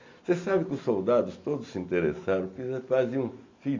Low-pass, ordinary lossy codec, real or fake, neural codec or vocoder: 7.2 kHz; MP3, 48 kbps; real; none